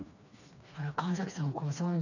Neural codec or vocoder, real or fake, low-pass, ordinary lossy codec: codec, 16 kHz, 2 kbps, FreqCodec, smaller model; fake; 7.2 kHz; none